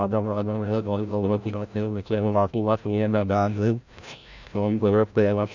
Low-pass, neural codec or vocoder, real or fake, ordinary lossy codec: 7.2 kHz; codec, 16 kHz, 0.5 kbps, FreqCodec, larger model; fake; none